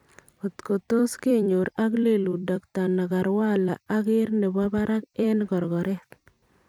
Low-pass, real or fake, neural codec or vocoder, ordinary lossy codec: 19.8 kHz; fake; vocoder, 44.1 kHz, 128 mel bands every 256 samples, BigVGAN v2; none